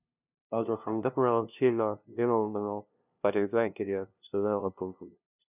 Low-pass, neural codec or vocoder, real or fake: 3.6 kHz; codec, 16 kHz, 0.5 kbps, FunCodec, trained on LibriTTS, 25 frames a second; fake